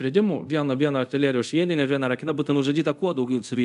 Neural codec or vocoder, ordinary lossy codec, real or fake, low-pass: codec, 24 kHz, 0.5 kbps, DualCodec; MP3, 96 kbps; fake; 10.8 kHz